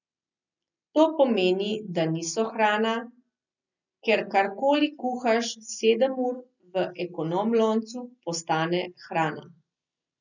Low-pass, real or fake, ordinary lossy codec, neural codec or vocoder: 7.2 kHz; real; none; none